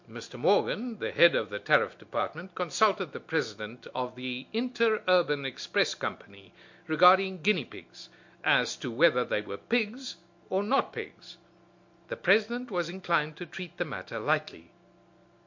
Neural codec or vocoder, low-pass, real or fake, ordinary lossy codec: none; 7.2 kHz; real; MP3, 48 kbps